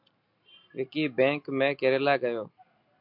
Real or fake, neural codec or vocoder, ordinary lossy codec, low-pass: real; none; MP3, 48 kbps; 5.4 kHz